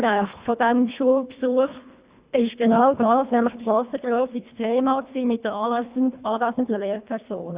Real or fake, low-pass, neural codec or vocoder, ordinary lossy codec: fake; 3.6 kHz; codec, 24 kHz, 1.5 kbps, HILCodec; Opus, 64 kbps